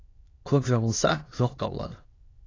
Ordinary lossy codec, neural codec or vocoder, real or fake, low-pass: AAC, 32 kbps; autoencoder, 22.05 kHz, a latent of 192 numbers a frame, VITS, trained on many speakers; fake; 7.2 kHz